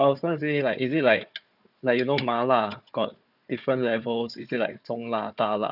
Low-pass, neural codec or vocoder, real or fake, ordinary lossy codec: 5.4 kHz; codec, 16 kHz, 4 kbps, FunCodec, trained on Chinese and English, 50 frames a second; fake; none